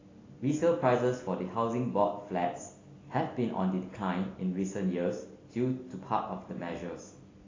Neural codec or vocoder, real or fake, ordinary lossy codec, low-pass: none; real; AAC, 32 kbps; 7.2 kHz